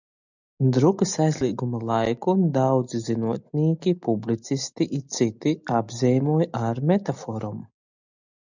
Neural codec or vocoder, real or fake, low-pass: none; real; 7.2 kHz